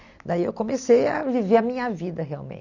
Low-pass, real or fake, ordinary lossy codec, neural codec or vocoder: 7.2 kHz; real; none; none